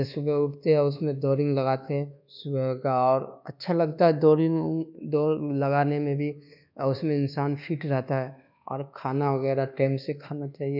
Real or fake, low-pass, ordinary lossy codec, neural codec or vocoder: fake; 5.4 kHz; none; codec, 24 kHz, 1.2 kbps, DualCodec